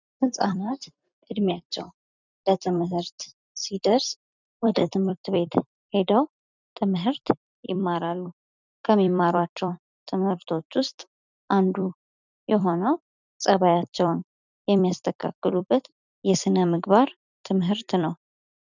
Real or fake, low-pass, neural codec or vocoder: fake; 7.2 kHz; vocoder, 44.1 kHz, 128 mel bands every 256 samples, BigVGAN v2